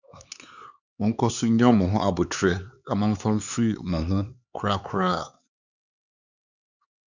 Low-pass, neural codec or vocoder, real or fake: 7.2 kHz; codec, 16 kHz, 4 kbps, X-Codec, HuBERT features, trained on LibriSpeech; fake